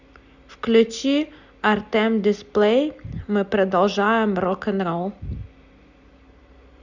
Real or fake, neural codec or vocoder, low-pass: real; none; 7.2 kHz